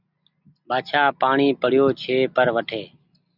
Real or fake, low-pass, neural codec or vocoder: real; 5.4 kHz; none